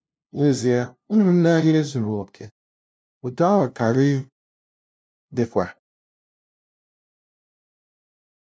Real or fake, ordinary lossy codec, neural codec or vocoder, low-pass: fake; none; codec, 16 kHz, 0.5 kbps, FunCodec, trained on LibriTTS, 25 frames a second; none